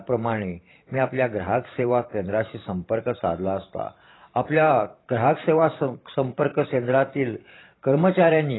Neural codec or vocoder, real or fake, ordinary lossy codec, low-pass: vocoder, 44.1 kHz, 128 mel bands every 512 samples, BigVGAN v2; fake; AAC, 16 kbps; 7.2 kHz